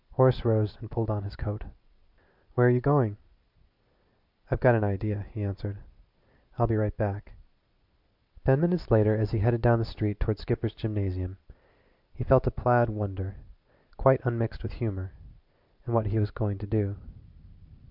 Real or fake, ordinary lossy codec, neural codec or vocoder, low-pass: real; MP3, 48 kbps; none; 5.4 kHz